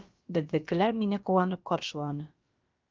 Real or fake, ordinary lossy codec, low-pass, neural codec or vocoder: fake; Opus, 32 kbps; 7.2 kHz; codec, 16 kHz, about 1 kbps, DyCAST, with the encoder's durations